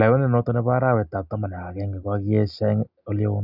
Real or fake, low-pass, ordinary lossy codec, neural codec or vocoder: real; 5.4 kHz; none; none